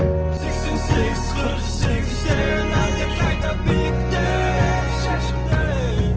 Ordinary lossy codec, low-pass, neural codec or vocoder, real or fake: Opus, 16 kbps; 7.2 kHz; none; real